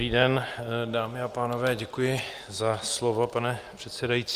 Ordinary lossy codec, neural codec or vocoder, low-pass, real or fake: Opus, 32 kbps; none; 14.4 kHz; real